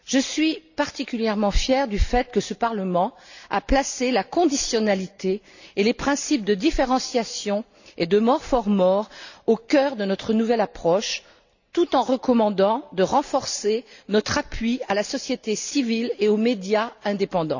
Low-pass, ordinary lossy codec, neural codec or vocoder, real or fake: 7.2 kHz; none; none; real